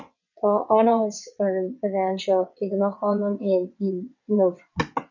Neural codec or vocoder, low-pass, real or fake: vocoder, 22.05 kHz, 80 mel bands, WaveNeXt; 7.2 kHz; fake